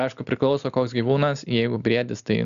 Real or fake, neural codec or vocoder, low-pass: real; none; 7.2 kHz